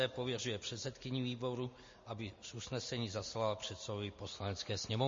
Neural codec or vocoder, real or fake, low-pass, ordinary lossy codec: none; real; 7.2 kHz; MP3, 32 kbps